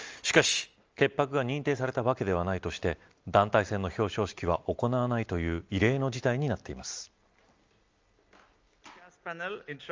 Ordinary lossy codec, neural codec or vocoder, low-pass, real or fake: Opus, 24 kbps; none; 7.2 kHz; real